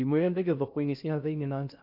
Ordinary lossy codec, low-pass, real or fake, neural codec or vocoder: none; 5.4 kHz; fake; codec, 16 kHz in and 24 kHz out, 0.6 kbps, FocalCodec, streaming, 2048 codes